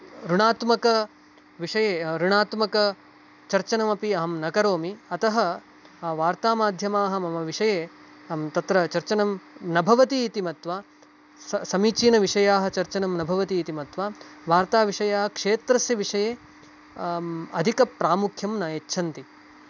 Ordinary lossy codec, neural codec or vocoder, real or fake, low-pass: none; none; real; 7.2 kHz